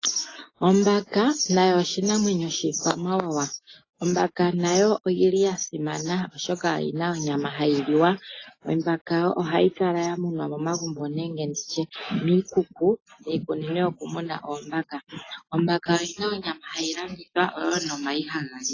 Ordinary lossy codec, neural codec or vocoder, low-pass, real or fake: AAC, 32 kbps; none; 7.2 kHz; real